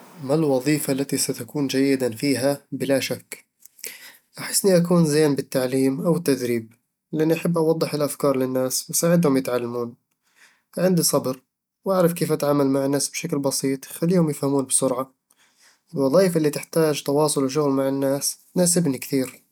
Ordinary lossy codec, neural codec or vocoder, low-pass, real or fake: none; none; none; real